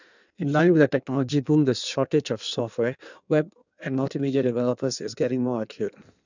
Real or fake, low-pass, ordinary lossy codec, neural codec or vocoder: fake; 7.2 kHz; none; codec, 16 kHz in and 24 kHz out, 1.1 kbps, FireRedTTS-2 codec